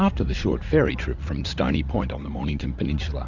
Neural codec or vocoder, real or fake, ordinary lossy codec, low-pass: vocoder, 44.1 kHz, 80 mel bands, Vocos; fake; Opus, 64 kbps; 7.2 kHz